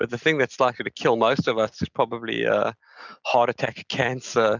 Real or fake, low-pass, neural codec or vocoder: real; 7.2 kHz; none